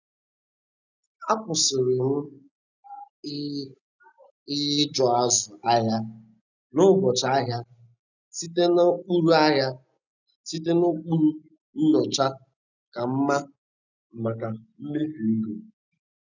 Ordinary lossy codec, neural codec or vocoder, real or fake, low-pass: none; none; real; 7.2 kHz